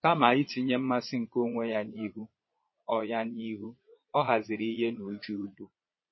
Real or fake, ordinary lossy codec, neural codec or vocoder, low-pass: fake; MP3, 24 kbps; vocoder, 22.05 kHz, 80 mel bands, Vocos; 7.2 kHz